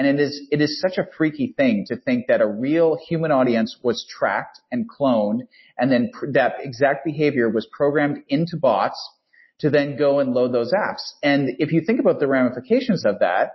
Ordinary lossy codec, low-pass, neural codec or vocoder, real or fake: MP3, 24 kbps; 7.2 kHz; none; real